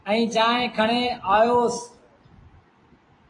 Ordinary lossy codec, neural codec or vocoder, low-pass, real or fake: AAC, 32 kbps; vocoder, 44.1 kHz, 128 mel bands every 256 samples, BigVGAN v2; 10.8 kHz; fake